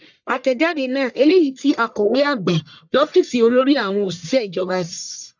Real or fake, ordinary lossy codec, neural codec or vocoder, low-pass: fake; none; codec, 44.1 kHz, 1.7 kbps, Pupu-Codec; 7.2 kHz